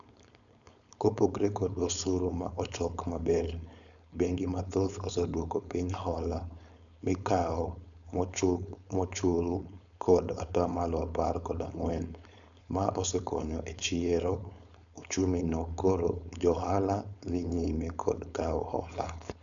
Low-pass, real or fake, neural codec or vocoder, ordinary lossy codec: 7.2 kHz; fake; codec, 16 kHz, 4.8 kbps, FACodec; none